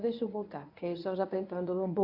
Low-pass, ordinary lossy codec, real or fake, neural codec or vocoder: 5.4 kHz; AAC, 32 kbps; fake; codec, 24 kHz, 0.9 kbps, WavTokenizer, medium speech release version 1